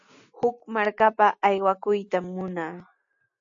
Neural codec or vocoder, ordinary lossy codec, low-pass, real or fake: none; AAC, 64 kbps; 7.2 kHz; real